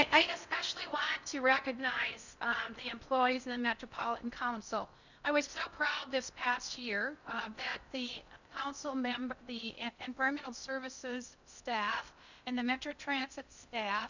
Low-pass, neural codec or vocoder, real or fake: 7.2 kHz; codec, 16 kHz in and 24 kHz out, 0.6 kbps, FocalCodec, streaming, 4096 codes; fake